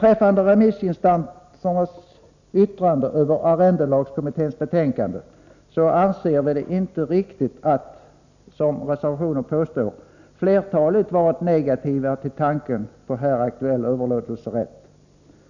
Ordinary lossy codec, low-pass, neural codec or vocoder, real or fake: none; 7.2 kHz; none; real